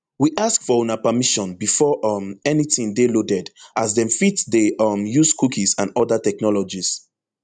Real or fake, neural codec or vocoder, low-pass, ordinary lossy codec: real; none; 9.9 kHz; none